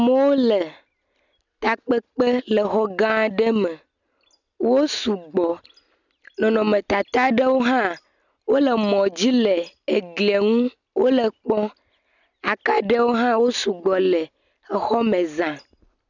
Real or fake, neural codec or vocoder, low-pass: real; none; 7.2 kHz